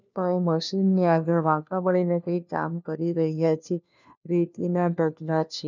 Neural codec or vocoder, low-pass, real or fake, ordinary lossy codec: codec, 16 kHz, 1 kbps, FunCodec, trained on LibriTTS, 50 frames a second; 7.2 kHz; fake; none